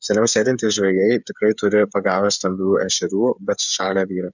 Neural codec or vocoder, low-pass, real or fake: codec, 16 kHz, 8 kbps, FreqCodec, smaller model; 7.2 kHz; fake